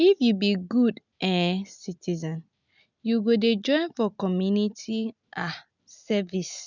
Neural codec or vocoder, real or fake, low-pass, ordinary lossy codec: none; real; 7.2 kHz; none